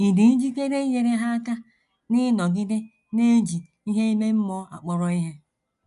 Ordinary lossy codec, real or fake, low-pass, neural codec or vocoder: none; real; 10.8 kHz; none